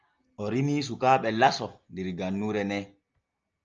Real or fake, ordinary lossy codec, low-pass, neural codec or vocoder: real; Opus, 24 kbps; 7.2 kHz; none